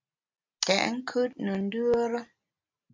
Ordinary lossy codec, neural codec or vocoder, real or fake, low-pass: MP3, 64 kbps; none; real; 7.2 kHz